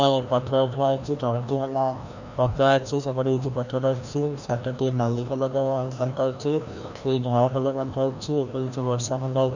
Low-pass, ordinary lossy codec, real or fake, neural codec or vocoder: 7.2 kHz; none; fake; codec, 16 kHz, 1 kbps, FreqCodec, larger model